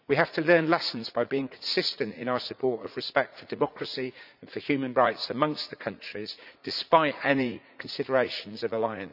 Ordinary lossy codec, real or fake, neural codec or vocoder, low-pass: none; fake; vocoder, 44.1 kHz, 80 mel bands, Vocos; 5.4 kHz